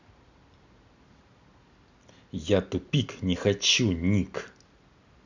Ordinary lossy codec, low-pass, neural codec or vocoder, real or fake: none; 7.2 kHz; none; real